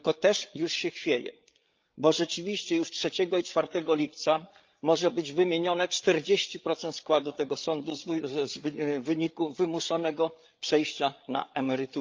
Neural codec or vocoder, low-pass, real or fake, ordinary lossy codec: codec, 16 kHz, 8 kbps, FreqCodec, larger model; 7.2 kHz; fake; Opus, 24 kbps